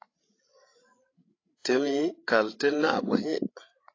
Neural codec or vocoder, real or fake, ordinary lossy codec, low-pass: codec, 16 kHz, 8 kbps, FreqCodec, larger model; fake; AAC, 32 kbps; 7.2 kHz